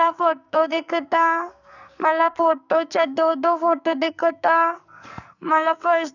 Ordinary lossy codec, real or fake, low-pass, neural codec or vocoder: none; fake; 7.2 kHz; codec, 44.1 kHz, 2.6 kbps, SNAC